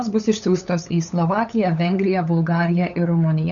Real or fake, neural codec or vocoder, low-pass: fake; codec, 16 kHz, 8 kbps, FunCodec, trained on LibriTTS, 25 frames a second; 7.2 kHz